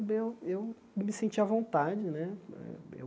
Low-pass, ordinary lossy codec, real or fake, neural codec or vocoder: none; none; real; none